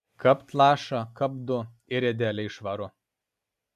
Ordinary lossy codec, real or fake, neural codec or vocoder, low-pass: MP3, 96 kbps; real; none; 14.4 kHz